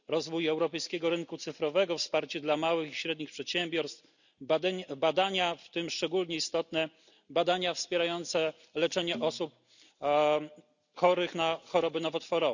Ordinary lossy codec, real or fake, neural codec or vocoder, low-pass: none; real; none; 7.2 kHz